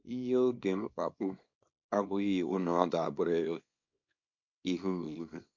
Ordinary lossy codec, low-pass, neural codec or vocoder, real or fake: MP3, 48 kbps; 7.2 kHz; codec, 24 kHz, 0.9 kbps, WavTokenizer, small release; fake